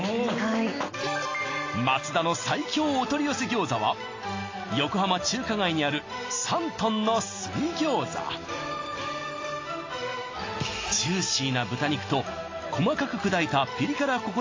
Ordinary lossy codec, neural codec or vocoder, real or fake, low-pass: AAC, 32 kbps; none; real; 7.2 kHz